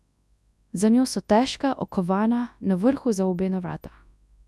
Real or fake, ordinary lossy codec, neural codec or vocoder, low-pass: fake; none; codec, 24 kHz, 0.9 kbps, WavTokenizer, large speech release; none